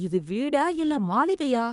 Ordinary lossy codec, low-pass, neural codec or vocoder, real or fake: none; 10.8 kHz; codec, 24 kHz, 1 kbps, SNAC; fake